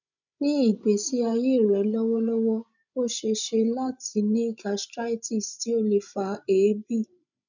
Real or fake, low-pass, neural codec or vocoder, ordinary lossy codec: fake; 7.2 kHz; codec, 16 kHz, 16 kbps, FreqCodec, larger model; none